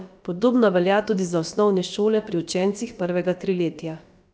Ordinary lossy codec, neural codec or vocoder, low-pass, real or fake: none; codec, 16 kHz, about 1 kbps, DyCAST, with the encoder's durations; none; fake